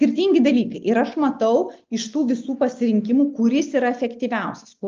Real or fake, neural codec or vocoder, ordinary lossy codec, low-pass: real; none; Opus, 24 kbps; 7.2 kHz